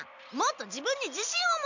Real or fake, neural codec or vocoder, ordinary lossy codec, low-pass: real; none; none; 7.2 kHz